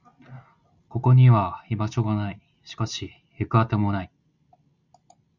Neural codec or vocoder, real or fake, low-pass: none; real; 7.2 kHz